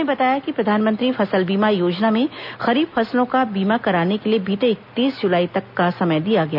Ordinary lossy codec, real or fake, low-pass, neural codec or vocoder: none; real; 5.4 kHz; none